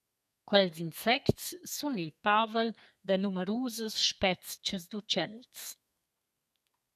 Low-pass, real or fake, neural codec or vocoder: 14.4 kHz; fake; codec, 32 kHz, 1.9 kbps, SNAC